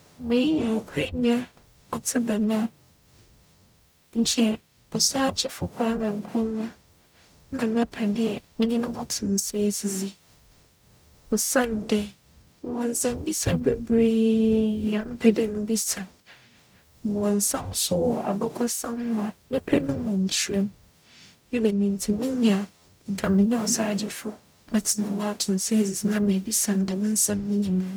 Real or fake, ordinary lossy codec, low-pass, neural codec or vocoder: fake; none; none; codec, 44.1 kHz, 0.9 kbps, DAC